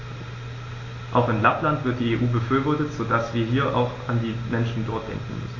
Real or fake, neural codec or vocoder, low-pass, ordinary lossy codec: real; none; 7.2 kHz; AAC, 32 kbps